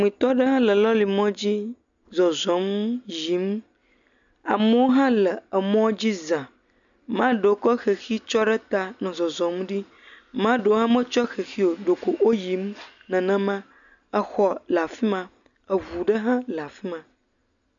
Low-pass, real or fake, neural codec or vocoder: 7.2 kHz; real; none